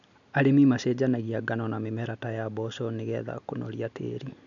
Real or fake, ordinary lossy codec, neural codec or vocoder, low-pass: real; none; none; 7.2 kHz